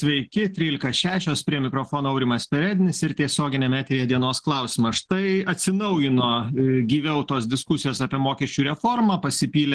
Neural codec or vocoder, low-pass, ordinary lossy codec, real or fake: none; 10.8 kHz; Opus, 16 kbps; real